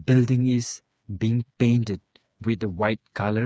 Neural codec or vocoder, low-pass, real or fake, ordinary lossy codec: codec, 16 kHz, 4 kbps, FreqCodec, smaller model; none; fake; none